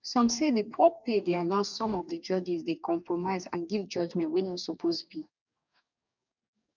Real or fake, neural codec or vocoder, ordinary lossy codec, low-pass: fake; codec, 44.1 kHz, 2.6 kbps, DAC; none; 7.2 kHz